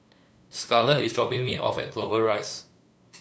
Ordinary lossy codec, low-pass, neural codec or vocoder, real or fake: none; none; codec, 16 kHz, 2 kbps, FunCodec, trained on LibriTTS, 25 frames a second; fake